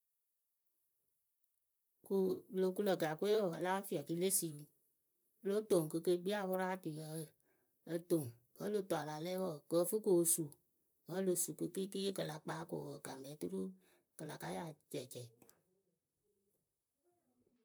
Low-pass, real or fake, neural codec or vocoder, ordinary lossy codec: none; fake; vocoder, 44.1 kHz, 128 mel bands, Pupu-Vocoder; none